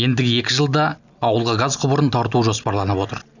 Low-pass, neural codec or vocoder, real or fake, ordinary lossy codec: 7.2 kHz; none; real; none